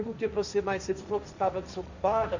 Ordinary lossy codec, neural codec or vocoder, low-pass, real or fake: none; codec, 16 kHz, 1.1 kbps, Voila-Tokenizer; 7.2 kHz; fake